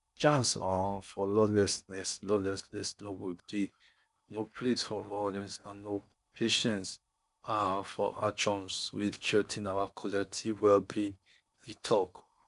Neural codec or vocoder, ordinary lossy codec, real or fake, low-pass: codec, 16 kHz in and 24 kHz out, 0.6 kbps, FocalCodec, streaming, 4096 codes; none; fake; 10.8 kHz